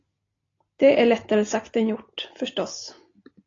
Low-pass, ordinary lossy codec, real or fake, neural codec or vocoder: 7.2 kHz; AAC, 32 kbps; real; none